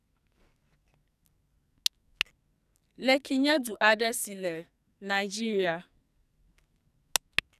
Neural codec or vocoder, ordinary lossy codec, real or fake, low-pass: codec, 44.1 kHz, 2.6 kbps, SNAC; none; fake; 14.4 kHz